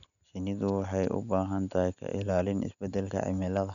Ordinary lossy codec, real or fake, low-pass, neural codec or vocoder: none; real; 7.2 kHz; none